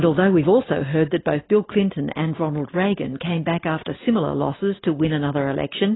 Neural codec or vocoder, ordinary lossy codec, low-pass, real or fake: none; AAC, 16 kbps; 7.2 kHz; real